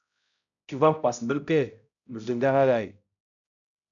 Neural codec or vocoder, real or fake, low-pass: codec, 16 kHz, 0.5 kbps, X-Codec, HuBERT features, trained on balanced general audio; fake; 7.2 kHz